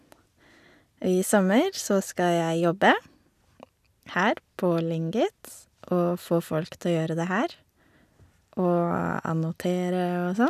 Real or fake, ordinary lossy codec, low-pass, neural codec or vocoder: real; none; 14.4 kHz; none